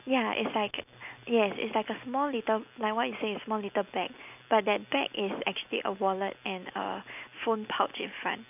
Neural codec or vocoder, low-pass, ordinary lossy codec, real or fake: none; 3.6 kHz; none; real